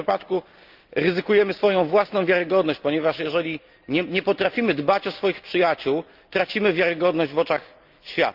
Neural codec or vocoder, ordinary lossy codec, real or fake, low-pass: none; Opus, 24 kbps; real; 5.4 kHz